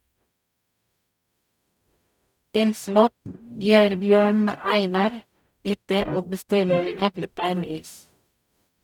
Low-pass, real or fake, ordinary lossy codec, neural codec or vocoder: 19.8 kHz; fake; none; codec, 44.1 kHz, 0.9 kbps, DAC